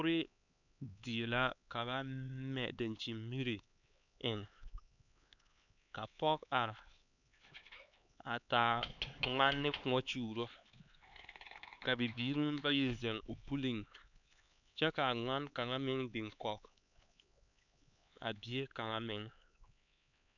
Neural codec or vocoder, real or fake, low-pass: codec, 16 kHz, 4 kbps, X-Codec, HuBERT features, trained on LibriSpeech; fake; 7.2 kHz